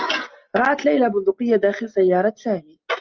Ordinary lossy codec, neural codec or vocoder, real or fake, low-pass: Opus, 24 kbps; none; real; 7.2 kHz